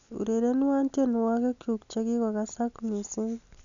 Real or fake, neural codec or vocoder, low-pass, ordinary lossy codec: real; none; 7.2 kHz; none